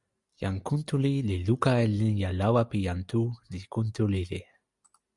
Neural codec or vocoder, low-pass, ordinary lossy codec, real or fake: none; 10.8 kHz; Opus, 64 kbps; real